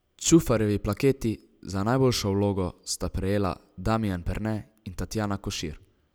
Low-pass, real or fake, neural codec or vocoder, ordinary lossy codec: none; real; none; none